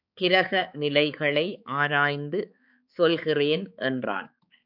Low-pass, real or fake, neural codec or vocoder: 5.4 kHz; fake; codec, 16 kHz, 4 kbps, X-Codec, HuBERT features, trained on balanced general audio